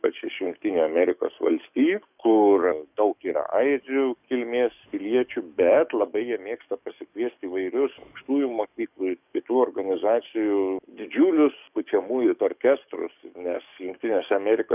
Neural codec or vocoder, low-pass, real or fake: codec, 44.1 kHz, 7.8 kbps, DAC; 3.6 kHz; fake